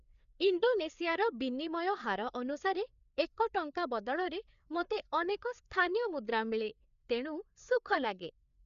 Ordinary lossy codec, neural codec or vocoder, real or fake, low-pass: none; codec, 16 kHz, 4 kbps, FreqCodec, larger model; fake; 7.2 kHz